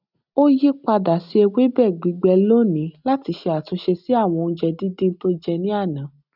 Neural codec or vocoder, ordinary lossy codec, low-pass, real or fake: none; none; 5.4 kHz; real